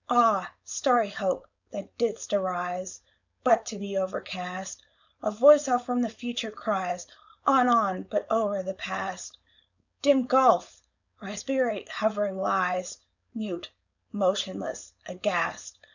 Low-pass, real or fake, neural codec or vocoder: 7.2 kHz; fake; codec, 16 kHz, 4.8 kbps, FACodec